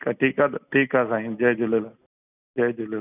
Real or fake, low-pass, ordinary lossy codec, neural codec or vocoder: real; 3.6 kHz; none; none